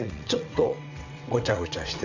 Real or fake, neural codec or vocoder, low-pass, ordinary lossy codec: fake; vocoder, 22.05 kHz, 80 mel bands, WaveNeXt; 7.2 kHz; none